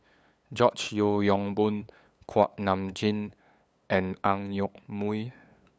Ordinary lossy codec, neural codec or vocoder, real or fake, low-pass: none; codec, 16 kHz, 8 kbps, FunCodec, trained on LibriTTS, 25 frames a second; fake; none